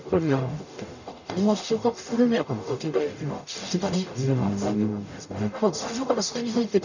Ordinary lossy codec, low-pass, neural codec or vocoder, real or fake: none; 7.2 kHz; codec, 44.1 kHz, 0.9 kbps, DAC; fake